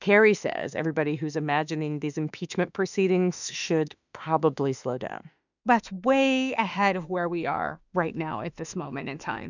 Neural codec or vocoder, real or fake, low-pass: autoencoder, 48 kHz, 32 numbers a frame, DAC-VAE, trained on Japanese speech; fake; 7.2 kHz